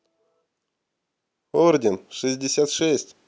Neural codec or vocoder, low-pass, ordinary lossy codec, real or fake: none; none; none; real